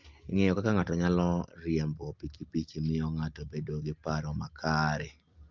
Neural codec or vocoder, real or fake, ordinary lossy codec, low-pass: none; real; Opus, 32 kbps; 7.2 kHz